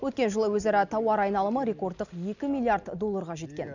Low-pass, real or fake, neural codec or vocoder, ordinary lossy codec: 7.2 kHz; real; none; none